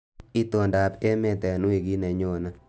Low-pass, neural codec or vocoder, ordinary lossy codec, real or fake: none; none; none; real